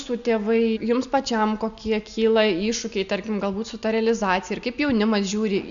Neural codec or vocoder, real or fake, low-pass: none; real; 7.2 kHz